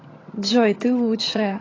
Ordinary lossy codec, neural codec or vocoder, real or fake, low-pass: AAC, 32 kbps; vocoder, 22.05 kHz, 80 mel bands, HiFi-GAN; fake; 7.2 kHz